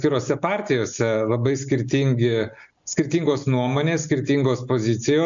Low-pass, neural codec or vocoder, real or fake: 7.2 kHz; none; real